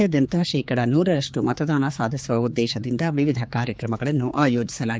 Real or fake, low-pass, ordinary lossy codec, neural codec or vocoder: fake; none; none; codec, 16 kHz, 4 kbps, X-Codec, HuBERT features, trained on general audio